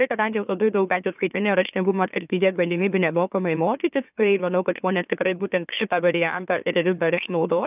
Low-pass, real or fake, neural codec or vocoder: 3.6 kHz; fake; autoencoder, 44.1 kHz, a latent of 192 numbers a frame, MeloTTS